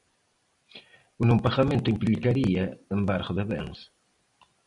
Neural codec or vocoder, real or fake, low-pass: vocoder, 44.1 kHz, 128 mel bands every 256 samples, BigVGAN v2; fake; 10.8 kHz